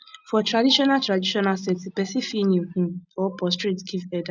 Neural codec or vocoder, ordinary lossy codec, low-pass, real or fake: none; none; 7.2 kHz; real